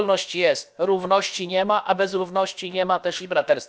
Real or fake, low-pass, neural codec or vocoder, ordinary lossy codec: fake; none; codec, 16 kHz, about 1 kbps, DyCAST, with the encoder's durations; none